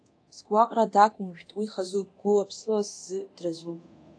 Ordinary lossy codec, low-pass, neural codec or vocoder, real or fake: AAC, 48 kbps; 9.9 kHz; codec, 24 kHz, 0.9 kbps, DualCodec; fake